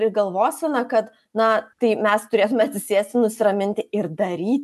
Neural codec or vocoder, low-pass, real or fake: none; 14.4 kHz; real